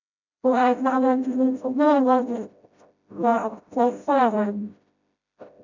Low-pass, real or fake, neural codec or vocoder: 7.2 kHz; fake; codec, 16 kHz, 0.5 kbps, FreqCodec, smaller model